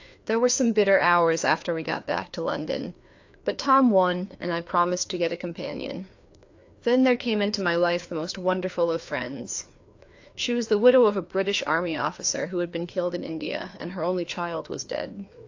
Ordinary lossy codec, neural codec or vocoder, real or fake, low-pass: AAC, 48 kbps; codec, 16 kHz, 2 kbps, FreqCodec, larger model; fake; 7.2 kHz